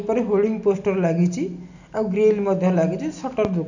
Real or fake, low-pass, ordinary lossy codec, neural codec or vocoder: real; 7.2 kHz; none; none